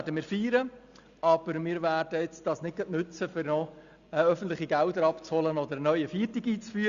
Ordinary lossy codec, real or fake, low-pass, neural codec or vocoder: Opus, 64 kbps; real; 7.2 kHz; none